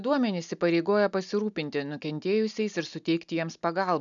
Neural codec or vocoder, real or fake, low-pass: none; real; 7.2 kHz